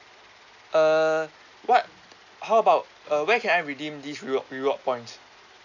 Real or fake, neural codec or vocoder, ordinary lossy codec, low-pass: real; none; none; 7.2 kHz